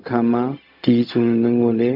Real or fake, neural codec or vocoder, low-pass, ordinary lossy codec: fake; codec, 16 kHz, 0.4 kbps, LongCat-Audio-Codec; 5.4 kHz; none